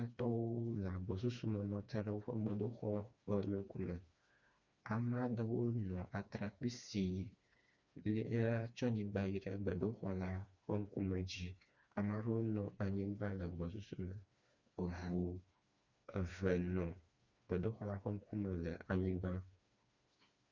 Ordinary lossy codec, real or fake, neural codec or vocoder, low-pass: Opus, 64 kbps; fake; codec, 16 kHz, 2 kbps, FreqCodec, smaller model; 7.2 kHz